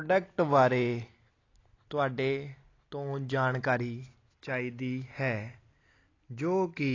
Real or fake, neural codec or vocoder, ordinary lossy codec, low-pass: real; none; AAC, 32 kbps; 7.2 kHz